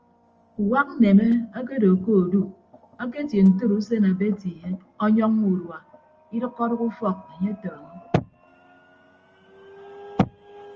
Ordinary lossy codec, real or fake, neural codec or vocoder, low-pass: Opus, 24 kbps; real; none; 7.2 kHz